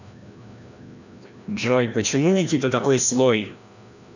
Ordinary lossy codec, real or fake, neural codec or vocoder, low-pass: none; fake; codec, 16 kHz, 1 kbps, FreqCodec, larger model; 7.2 kHz